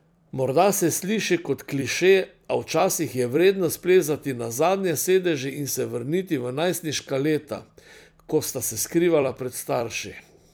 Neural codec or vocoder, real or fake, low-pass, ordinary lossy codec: vocoder, 44.1 kHz, 128 mel bands every 256 samples, BigVGAN v2; fake; none; none